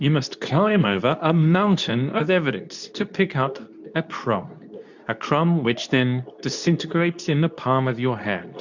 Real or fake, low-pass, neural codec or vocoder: fake; 7.2 kHz; codec, 24 kHz, 0.9 kbps, WavTokenizer, medium speech release version 2